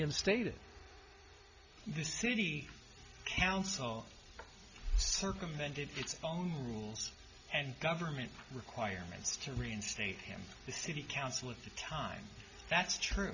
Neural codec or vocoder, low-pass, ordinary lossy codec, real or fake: none; 7.2 kHz; Opus, 64 kbps; real